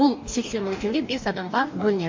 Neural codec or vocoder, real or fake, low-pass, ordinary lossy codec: codec, 44.1 kHz, 2.6 kbps, DAC; fake; 7.2 kHz; MP3, 48 kbps